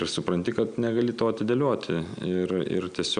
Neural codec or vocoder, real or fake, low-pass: none; real; 9.9 kHz